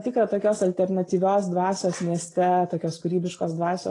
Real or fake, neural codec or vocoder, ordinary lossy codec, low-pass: real; none; AAC, 32 kbps; 10.8 kHz